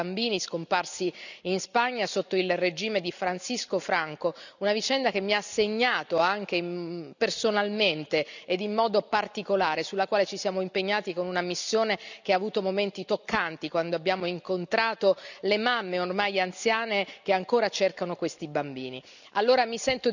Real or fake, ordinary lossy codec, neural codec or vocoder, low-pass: real; none; none; 7.2 kHz